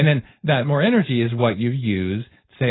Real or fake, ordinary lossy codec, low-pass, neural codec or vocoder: fake; AAC, 16 kbps; 7.2 kHz; codec, 16 kHz, 4.8 kbps, FACodec